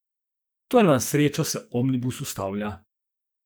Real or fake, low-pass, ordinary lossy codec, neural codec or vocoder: fake; none; none; codec, 44.1 kHz, 2.6 kbps, SNAC